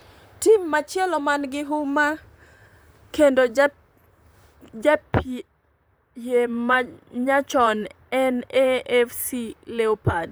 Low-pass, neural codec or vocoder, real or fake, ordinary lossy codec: none; vocoder, 44.1 kHz, 128 mel bands, Pupu-Vocoder; fake; none